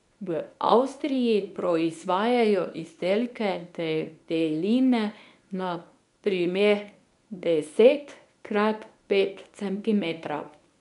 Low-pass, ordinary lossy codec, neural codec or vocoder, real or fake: 10.8 kHz; none; codec, 24 kHz, 0.9 kbps, WavTokenizer, medium speech release version 1; fake